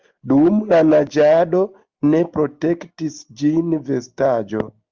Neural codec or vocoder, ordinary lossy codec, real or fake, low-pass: none; Opus, 32 kbps; real; 7.2 kHz